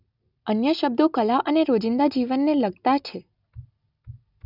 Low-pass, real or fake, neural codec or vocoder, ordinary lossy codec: 5.4 kHz; real; none; none